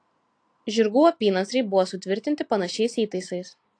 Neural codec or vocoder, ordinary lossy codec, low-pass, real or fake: none; AAC, 48 kbps; 9.9 kHz; real